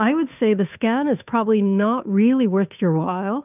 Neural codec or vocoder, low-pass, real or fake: codec, 16 kHz, 2 kbps, FunCodec, trained on Chinese and English, 25 frames a second; 3.6 kHz; fake